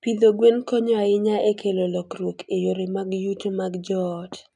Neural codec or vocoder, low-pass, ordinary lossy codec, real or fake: none; 10.8 kHz; none; real